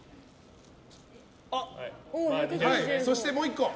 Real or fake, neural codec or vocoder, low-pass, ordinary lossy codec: real; none; none; none